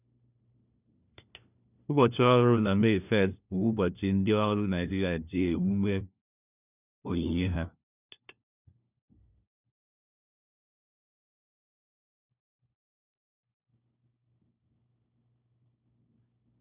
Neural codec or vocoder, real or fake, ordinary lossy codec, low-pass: codec, 16 kHz, 1 kbps, FunCodec, trained on LibriTTS, 50 frames a second; fake; none; 3.6 kHz